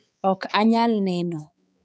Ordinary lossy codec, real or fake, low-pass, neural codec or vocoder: none; fake; none; codec, 16 kHz, 4 kbps, X-Codec, HuBERT features, trained on balanced general audio